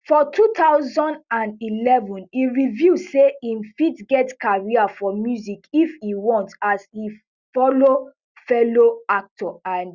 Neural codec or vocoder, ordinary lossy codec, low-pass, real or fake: none; Opus, 64 kbps; 7.2 kHz; real